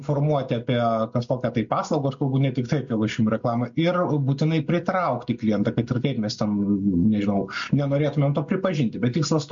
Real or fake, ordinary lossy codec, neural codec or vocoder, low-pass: real; MP3, 48 kbps; none; 7.2 kHz